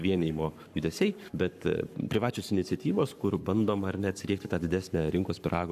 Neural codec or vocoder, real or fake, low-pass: codec, 44.1 kHz, 7.8 kbps, Pupu-Codec; fake; 14.4 kHz